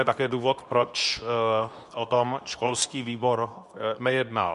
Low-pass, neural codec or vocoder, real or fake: 10.8 kHz; codec, 24 kHz, 0.9 kbps, WavTokenizer, medium speech release version 2; fake